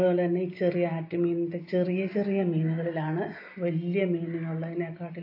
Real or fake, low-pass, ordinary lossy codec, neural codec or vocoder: real; 5.4 kHz; AAC, 32 kbps; none